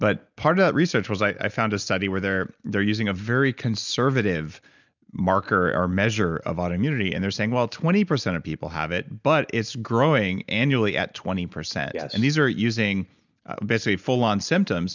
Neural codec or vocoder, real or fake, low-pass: none; real; 7.2 kHz